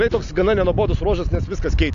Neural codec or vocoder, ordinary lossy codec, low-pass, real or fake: none; AAC, 64 kbps; 7.2 kHz; real